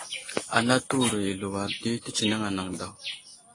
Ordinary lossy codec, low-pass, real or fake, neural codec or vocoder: AAC, 32 kbps; 10.8 kHz; real; none